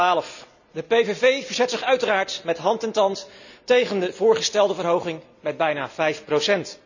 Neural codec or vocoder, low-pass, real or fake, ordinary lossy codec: none; 7.2 kHz; real; none